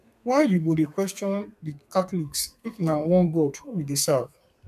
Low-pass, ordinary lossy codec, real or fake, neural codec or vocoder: 14.4 kHz; none; fake; codec, 32 kHz, 1.9 kbps, SNAC